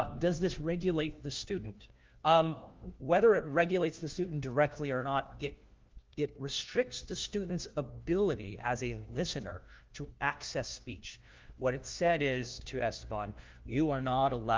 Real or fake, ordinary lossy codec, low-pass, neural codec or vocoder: fake; Opus, 32 kbps; 7.2 kHz; codec, 16 kHz, 1 kbps, FunCodec, trained on LibriTTS, 50 frames a second